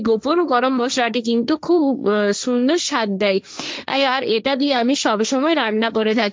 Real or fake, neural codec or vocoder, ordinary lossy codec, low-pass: fake; codec, 16 kHz, 1.1 kbps, Voila-Tokenizer; none; none